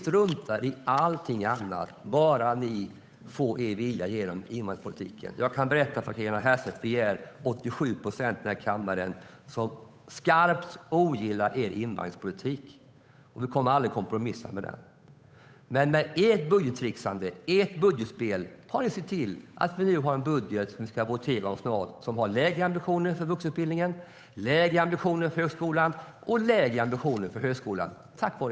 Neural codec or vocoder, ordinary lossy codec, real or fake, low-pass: codec, 16 kHz, 8 kbps, FunCodec, trained on Chinese and English, 25 frames a second; none; fake; none